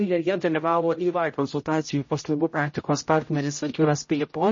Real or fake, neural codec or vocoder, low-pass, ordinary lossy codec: fake; codec, 16 kHz, 0.5 kbps, X-Codec, HuBERT features, trained on general audio; 7.2 kHz; MP3, 32 kbps